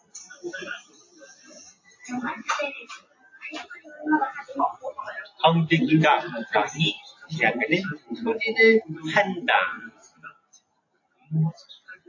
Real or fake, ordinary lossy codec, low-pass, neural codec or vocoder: real; AAC, 48 kbps; 7.2 kHz; none